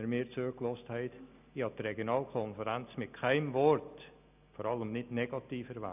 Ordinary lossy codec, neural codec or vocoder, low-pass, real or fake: none; none; 3.6 kHz; real